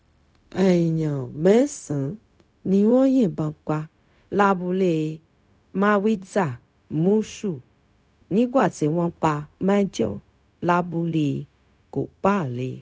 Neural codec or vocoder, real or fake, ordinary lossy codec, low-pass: codec, 16 kHz, 0.4 kbps, LongCat-Audio-Codec; fake; none; none